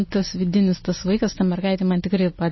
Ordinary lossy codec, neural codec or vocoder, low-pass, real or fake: MP3, 24 kbps; none; 7.2 kHz; real